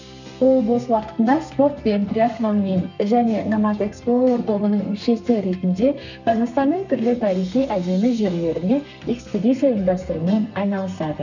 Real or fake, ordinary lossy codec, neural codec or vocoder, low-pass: fake; none; codec, 32 kHz, 1.9 kbps, SNAC; 7.2 kHz